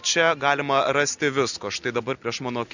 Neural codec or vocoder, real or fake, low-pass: none; real; 7.2 kHz